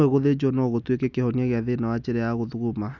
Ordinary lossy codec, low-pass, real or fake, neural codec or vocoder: none; 7.2 kHz; real; none